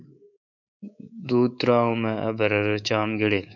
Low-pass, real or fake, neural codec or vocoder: 7.2 kHz; fake; codec, 24 kHz, 3.1 kbps, DualCodec